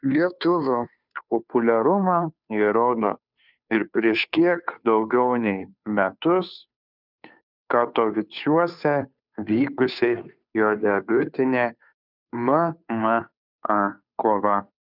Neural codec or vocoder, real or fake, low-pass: codec, 16 kHz, 2 kbps, FunCodec, trained on Chinese and English, 25 frames a second; fake; 5.4 kHz